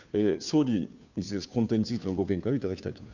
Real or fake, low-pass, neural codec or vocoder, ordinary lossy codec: fake; 7.2 kHz; codec, 16 kHz, 2 kbps, FunCodec, trained on Chinese and English, 25 frames a second; none